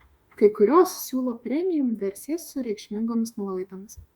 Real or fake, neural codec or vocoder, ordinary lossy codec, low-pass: fake; autoencoder, 48 kHz, 32 numbers a frame, DAC-VAE, trained on Japanese speech; Opus, 64 kbps; 19.8 kHz